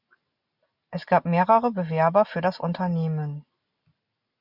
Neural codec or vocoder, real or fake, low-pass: none; real; 5.4 kHz